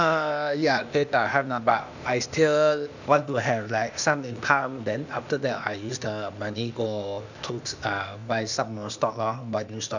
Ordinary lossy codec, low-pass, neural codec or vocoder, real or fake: none; 7.2 kHz; codec, 16 kHz, 0.8 kbps, ZipCodec; fake